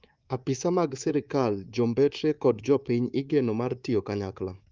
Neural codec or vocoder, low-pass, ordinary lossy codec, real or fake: codec, 24 kHz, 3.1 kbps, DualCodec; 7.2 kHz; Opus, 32 kbps; fake